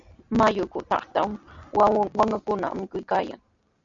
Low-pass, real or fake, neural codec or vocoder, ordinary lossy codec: 7.2 kHz; real; none; MP3, 48 kbps